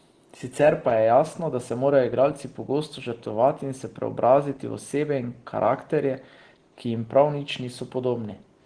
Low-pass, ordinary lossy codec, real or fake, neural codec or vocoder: 9.9 kHz; Opus, 16 kbps; real; none